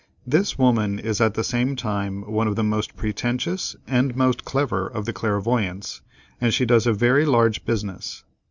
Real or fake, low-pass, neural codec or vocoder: real; 7.2 kHz; none